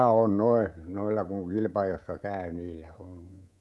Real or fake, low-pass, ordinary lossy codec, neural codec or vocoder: fake; none; none; codec, 24 kHz, 3.1 kbps, DualCodec